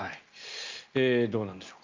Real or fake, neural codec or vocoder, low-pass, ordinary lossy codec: real; none; 7.2 kHz; Opus, 24 kbps